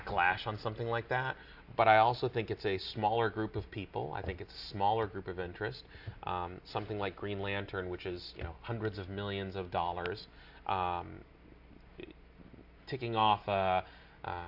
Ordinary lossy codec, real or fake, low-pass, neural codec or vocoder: AAC, 48 kbps; real; 5.4 kHz; none